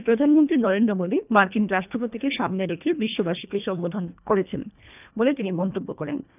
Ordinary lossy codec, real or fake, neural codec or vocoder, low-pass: none; fake; codec, 24 kHz, 1.5 kbps, HILCodec; 3.6 kHz